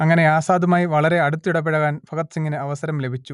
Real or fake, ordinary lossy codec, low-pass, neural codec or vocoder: real; none; 10.8 kHz; none